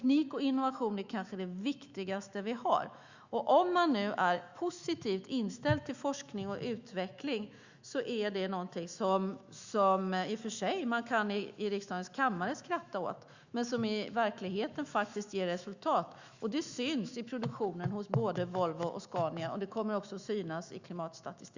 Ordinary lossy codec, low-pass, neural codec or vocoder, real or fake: Opus, 64 kbps; 7.2 kHz; autoencoder, 48 kHz, 128 numbers a frame, DAC-VAE, trained on Japanese speech; fake